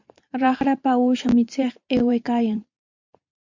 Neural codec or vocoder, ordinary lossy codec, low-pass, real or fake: vocoder, 44.1 kHz, 128 mel bands every 512 samples, BigVGAN v2; MP3, 48 kbps; 7.2 kHz; fake